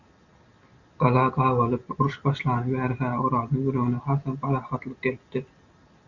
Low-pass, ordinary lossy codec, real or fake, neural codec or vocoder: 7.2 kHz; Opus, 64 kbps; real; none